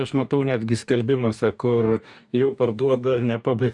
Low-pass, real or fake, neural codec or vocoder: 10.8 kHz; fake; codec, 44.1 kHz, 2.6 kbps, DAC